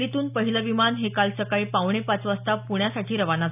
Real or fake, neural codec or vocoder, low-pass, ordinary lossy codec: real; none; 3.6 kHz; none